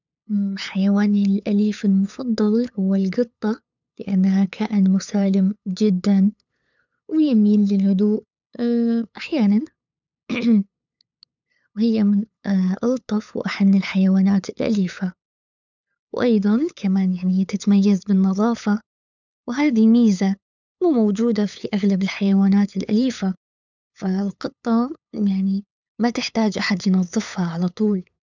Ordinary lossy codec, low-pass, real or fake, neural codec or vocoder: none; 7.2 kHz; fake; codec, 16 kHz, 8 kbps, FunCodec, trained on LibriTTS, 25 frames a second